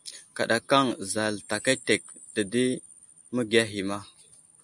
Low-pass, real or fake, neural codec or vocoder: 10.8 kHz; real; none